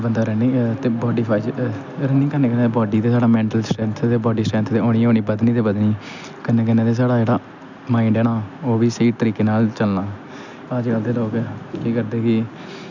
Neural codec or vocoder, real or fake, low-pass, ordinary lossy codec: none; real; 7.2 kHz; none